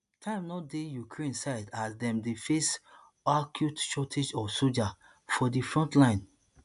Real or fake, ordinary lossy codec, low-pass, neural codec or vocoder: real; none; 10.8 kHz; none